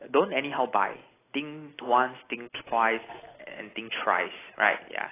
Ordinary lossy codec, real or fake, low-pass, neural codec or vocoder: AAC, 16 kbps; real; 3.6 kHz; none